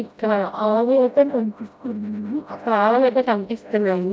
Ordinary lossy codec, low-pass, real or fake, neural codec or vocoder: none; none; fake; codec, 16 kHz, 0.5 kbps, FreqCodec, smaller model